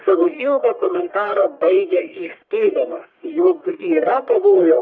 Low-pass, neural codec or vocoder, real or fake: 7.2 kHz; codec, 44.1 kHz, 1.7 kbps, Pupu-Codec; fake